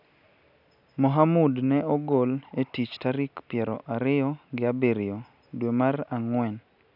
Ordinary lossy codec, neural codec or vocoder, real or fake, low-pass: none; none; real; 5.4 kHz